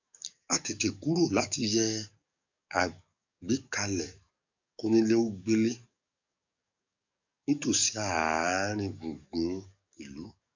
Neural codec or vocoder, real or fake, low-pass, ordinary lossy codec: codec, 44.1 kHz, 7.8 kbps, DAC; fake; 7.2 kHz; none